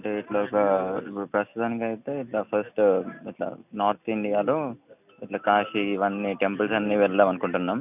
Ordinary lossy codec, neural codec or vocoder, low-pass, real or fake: none; none; 3.6 kHz; real